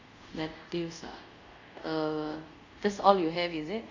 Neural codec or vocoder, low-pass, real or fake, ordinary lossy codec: codec, 24 kHz, 0.5 kbps, DualCodec; 7.2 kHz; fake; Opus, 64 kbps